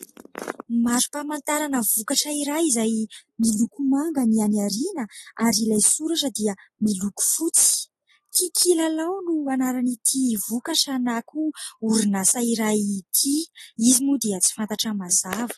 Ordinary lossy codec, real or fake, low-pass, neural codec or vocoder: AAC, 32 kbps; real; 19.8 kHz; none